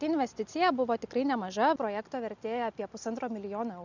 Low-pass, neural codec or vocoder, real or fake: 7.2 kHz; none; real